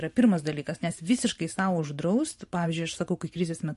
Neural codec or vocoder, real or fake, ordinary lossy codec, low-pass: vocoder, 44.1 kHz, 128 mel bands every 512 samples, BigVGAN v2; fake; MP3, 48 kbps; 14.4 kHz